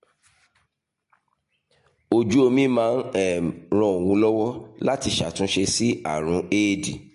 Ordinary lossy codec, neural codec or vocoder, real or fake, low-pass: MP3, 48 kbps; none; real; 14.4 kHz